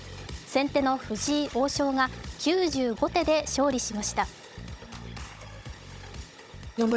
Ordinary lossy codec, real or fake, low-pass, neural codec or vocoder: none; fake; none; codec, 16 kHz, 16 kbps, FunCodec, trained on Chinese and English, 50 frames a second